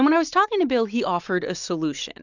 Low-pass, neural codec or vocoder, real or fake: 7.2 kHz; none; real